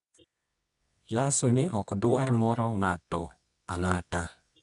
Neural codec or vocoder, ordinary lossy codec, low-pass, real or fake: codec, 24 kHz, 0.9 kbps, WavTokenizer, medium music audio release; none; 10.8 kHz; fake